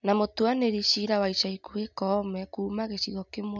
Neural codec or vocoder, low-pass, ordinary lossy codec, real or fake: none; 7.2 kHz; none; real